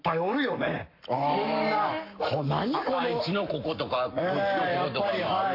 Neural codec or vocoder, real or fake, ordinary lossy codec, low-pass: codec, 44.1 kHz, 7.8 kbps, Pupu-Codec; fake; AAC, 32 kbps; 5.4 kHz